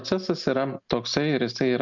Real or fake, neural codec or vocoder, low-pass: real; none; 7.2 kHz